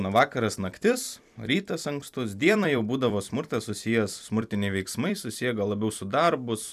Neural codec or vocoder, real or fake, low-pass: vocoder, 44.1 kHz, 128 mel bands every 256 samples, BigVGAN v2; fake; 14.4 kHz